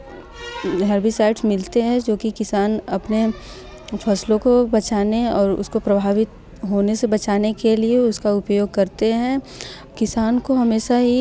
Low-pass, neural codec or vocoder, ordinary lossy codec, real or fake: none; none; none; real